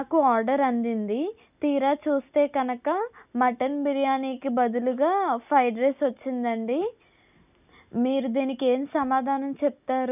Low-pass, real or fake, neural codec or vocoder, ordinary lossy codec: 3.6 kHz; real; none; none